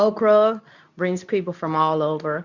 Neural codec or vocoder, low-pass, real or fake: codec, 24 kHz, 0.9 kbps, WavTokenizer, medium speech release version 2; 7.2 kHz; fake